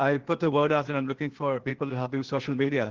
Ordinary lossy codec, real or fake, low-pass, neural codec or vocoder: Opus, 16 kbps; fake; 7.2 kHz; codec, 16 kHz, 0.8 kbps, ZipCodec